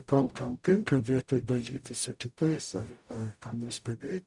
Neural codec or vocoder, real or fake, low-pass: codec, 44.1 kHz, 0.9 kbps, DAC; fake; 10.8 kHz